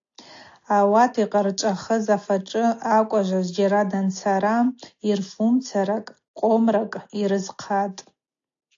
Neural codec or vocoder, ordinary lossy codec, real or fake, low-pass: none; AAC, 48 kbps; real; 7.2 kHz